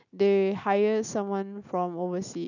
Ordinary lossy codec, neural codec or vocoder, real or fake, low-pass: none; none; real; 7.2 kHz